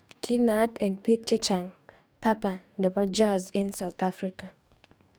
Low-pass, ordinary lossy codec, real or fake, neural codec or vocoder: none; none; fake; codec, 44.1 kHz, 2.6 kbps, DAC